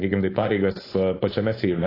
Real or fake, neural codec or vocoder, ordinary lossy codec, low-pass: fake; codec, 16 kHz, 4.8 kbps, FACodec; AAC, 24 kbps; 5.4 kHz